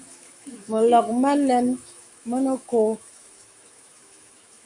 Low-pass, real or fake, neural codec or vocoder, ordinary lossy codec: 10.8 kHz; fake; autoencoder, 48 kHz, 128 numbers a frame, DAC-VAE, trained on Japanese speech; Opus, 64 kbps